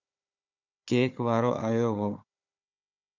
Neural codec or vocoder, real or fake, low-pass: codec, 16 kHz, 4 kbps, FunCodec, trained on Chinese and English, 50 frames a second; fake; 7.2 kHz